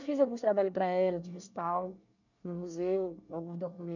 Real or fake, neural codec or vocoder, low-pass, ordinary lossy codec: fake; codec, 24 kHz, 1 kbps, SNAC; 7.2 kHz; none